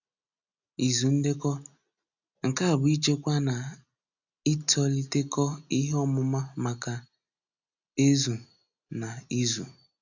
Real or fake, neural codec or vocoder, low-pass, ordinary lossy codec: real; none; 7.2 kHz; none